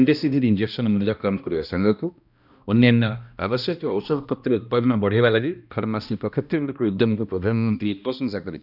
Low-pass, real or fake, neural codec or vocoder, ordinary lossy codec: 5.4 kHz; fake; codec, 16 kHz, 1 kbps, X-Codec, HuBERT features, trained on balanced general audio; none